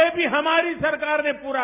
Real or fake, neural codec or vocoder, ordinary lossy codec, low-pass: real; none; none; 3.6 kHz